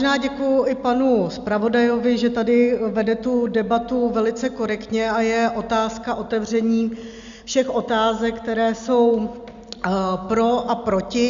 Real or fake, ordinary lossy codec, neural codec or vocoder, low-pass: real; Opus, 64 kbps; none; 7.2 kHz